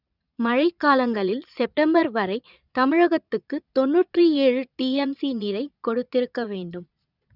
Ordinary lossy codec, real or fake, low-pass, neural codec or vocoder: none; fake; 5.4 kHz; vocoder, 22.05 kHz, 80 mel bands, Vocos